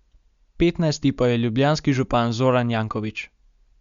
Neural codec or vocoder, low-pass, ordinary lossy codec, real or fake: none; 7.2 kHz; Opus, 64 kbps; real